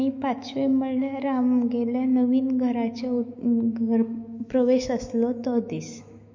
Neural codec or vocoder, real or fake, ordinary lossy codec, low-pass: autoencoder, 48 kHz, 128 numbers a frame, DAC-VAE, trained on Japanese speech; fake; MP3, 48 kbps; 7.2 kHz